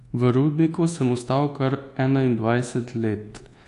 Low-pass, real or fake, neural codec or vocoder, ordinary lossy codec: 10.8 kHz; fake; codec, 24 kHz, 1.2 kbps, DualCodec; AAC, 48 kbps